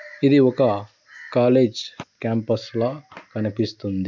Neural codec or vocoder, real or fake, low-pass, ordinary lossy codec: none; real; 7.2 kHz; none